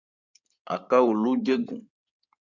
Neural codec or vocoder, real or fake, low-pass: codec, 16 kHz in and 24 kHz out, 2.2 kbps, FireRedTTS-2 codec; fake; 7.2 kHz